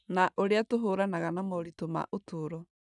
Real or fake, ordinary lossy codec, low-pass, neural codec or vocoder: real; none; 10.8 kHz; none